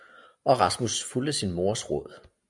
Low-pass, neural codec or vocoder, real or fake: 10.8 kHz; none; real